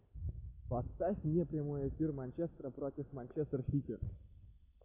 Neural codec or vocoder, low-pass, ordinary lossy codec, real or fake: none; 3.6 kHz; AAC, 24 kbps; real